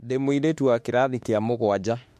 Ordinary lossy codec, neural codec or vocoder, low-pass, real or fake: MP3, 64 kbps; autoencoder, 48 kHz, 32 numbers a frame, DAC-VAE, trained on Japanese speech; 14.4 kHz; fake